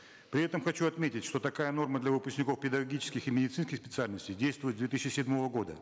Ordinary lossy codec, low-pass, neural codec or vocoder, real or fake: none; none; none; real